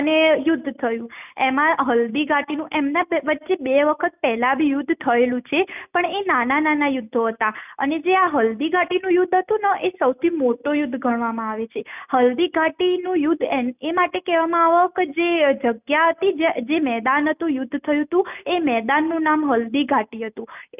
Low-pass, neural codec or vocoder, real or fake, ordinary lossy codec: 3.6 kHz; none; real; none